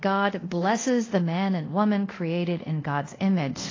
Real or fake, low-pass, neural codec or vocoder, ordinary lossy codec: fake; 7.2 kHz; codec, 24 kHz, 0.5 kbps, DualCodec; AAC, 32 kbps